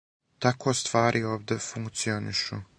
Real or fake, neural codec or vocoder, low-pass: real; none; 9.9 kHz